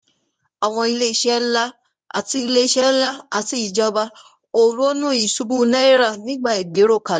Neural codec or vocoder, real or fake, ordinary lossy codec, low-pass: codec, 24 kHz, 0.9 kbps, WavTokenizer, medium speech release version 1; fake; none; 9.9 kHz